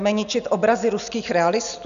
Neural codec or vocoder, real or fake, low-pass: none; real; 7.2 kHz